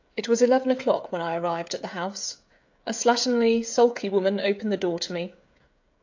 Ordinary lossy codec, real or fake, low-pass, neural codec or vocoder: AAC, 48 kbps; fake; 7.2 kHz; codec, 16 kHz, 16 kbps, FreqCodec, smaller model